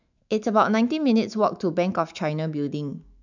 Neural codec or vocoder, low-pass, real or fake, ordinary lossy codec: autoencoder, 48 kHz, 128 numbers a frame, DAC-VAE, trained on Japanese speech; 7.2 kHz; fake; none